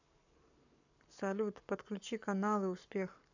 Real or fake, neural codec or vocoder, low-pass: fake; codec, 44.1 kHz, 7.8 kbps, Pupu-Codec; 7.2 kHz